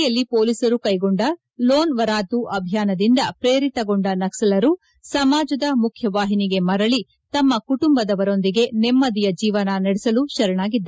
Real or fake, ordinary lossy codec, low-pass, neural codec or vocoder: real; none; none; none